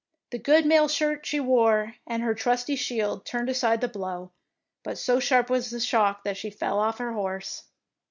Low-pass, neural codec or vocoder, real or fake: 7.2 kHz; none; real